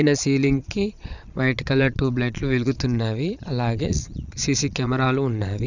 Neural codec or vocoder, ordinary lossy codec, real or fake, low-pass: vocoder, 22.05 kHz, 80 mel bands, Vocos; none; fake; 7.2 kHz